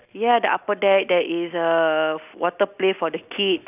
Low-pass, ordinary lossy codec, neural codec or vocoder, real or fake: 3.6 kHz; none; none; real